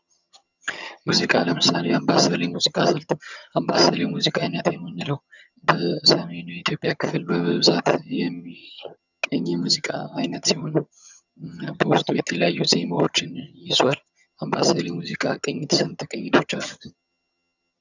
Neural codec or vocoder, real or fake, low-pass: vocoder, 22.05 kHz, 80 mel bands, HiFi-GAN; fake; 7.2 kHz